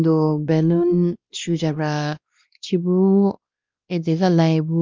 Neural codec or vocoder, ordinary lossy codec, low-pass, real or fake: codec, 16 kHz, 1 kbps, X-Codec, WavLM features, trained on Multilingual LibriSpeech; Opus, 32 kbps; 7.2 kHz; fake